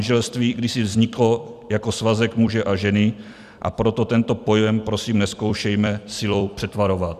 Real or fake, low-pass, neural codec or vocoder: fake; 14.4 kHz; vocoder, 44.1 kHz, 128 mel bands every 256 samples, BigVGAN v2